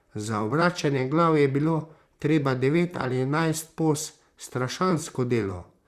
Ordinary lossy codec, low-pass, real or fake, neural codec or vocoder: Opus, 64 kbps; 14.4 kHz; fake; vocoder, 44.1 kHz, 128 mel bands, Pupu-Vocoder